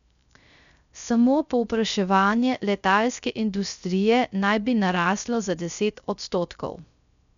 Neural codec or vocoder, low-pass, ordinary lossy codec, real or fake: codec, 16 kHz, 0.3 kbps, FocalCodec; 7.2 kHz; none; fake